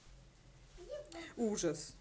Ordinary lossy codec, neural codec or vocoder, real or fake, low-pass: none; none; real; none